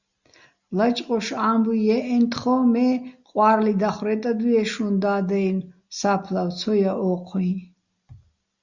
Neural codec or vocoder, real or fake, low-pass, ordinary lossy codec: none; real; 7.2 kHz; Opus, 64 kbps